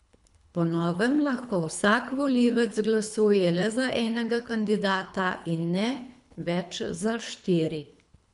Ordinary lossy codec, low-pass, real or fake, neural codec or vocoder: none; 10.8 kHz; fake; codec, 24 kHz, 3 kbps, HILCodec